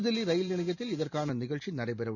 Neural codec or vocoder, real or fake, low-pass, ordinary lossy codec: none; real; 7.2 kHz; none